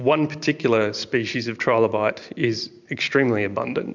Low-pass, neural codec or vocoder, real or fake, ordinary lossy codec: 7.2 kHz; vocoder, 44.1 kHz, 128 mel bands every 512 samples, BigVGAN v2; fake; MP3, 64 kbps